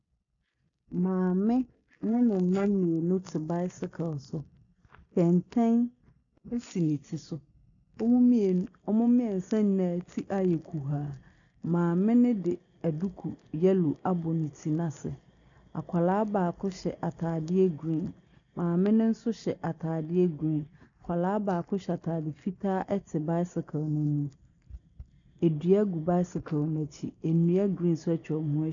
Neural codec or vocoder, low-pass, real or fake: none; 7.2 kHz; real